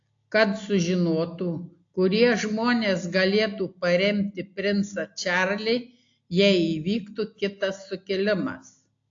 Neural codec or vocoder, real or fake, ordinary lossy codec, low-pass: none; real; AAC, 48 kbps; 7.2 kHz